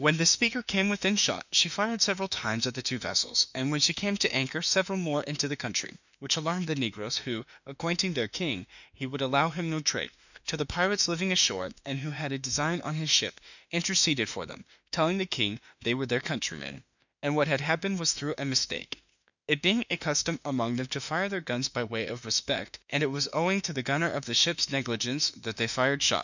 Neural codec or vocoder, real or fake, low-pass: autoencoder, 48 kHz, 32 numbers a frame, DAC-VAE, trained on Japanese speech; fake; 7.2 kHz